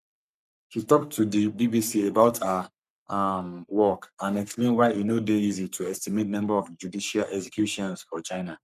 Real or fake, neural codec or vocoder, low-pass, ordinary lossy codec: fake; codec, 44.1 kHz, 3.4 kbps, Pupu-Codec; 14.4 kHz; none